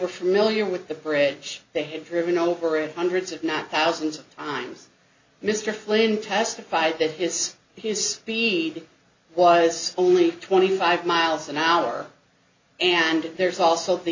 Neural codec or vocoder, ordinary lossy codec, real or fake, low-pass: none; MP3, 48 kbps; real; 7.2 kHz